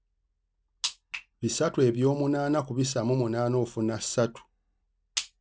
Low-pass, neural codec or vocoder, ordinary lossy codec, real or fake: none; none; none; real